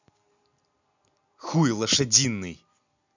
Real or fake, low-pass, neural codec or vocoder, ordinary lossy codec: real; 7.2 kHz; none; none